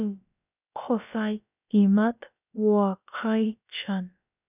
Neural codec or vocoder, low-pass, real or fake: codec, 16 kHz, about 1 kbps, DyCAST, with the encoder's durations; 3.6 kHz; fake